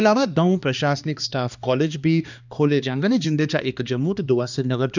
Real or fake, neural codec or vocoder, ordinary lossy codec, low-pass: fake; codec, 16 kHz, 2 kbps, X-Codec, HuBERT features, trained on balanced general audio; none; 7.2 kHz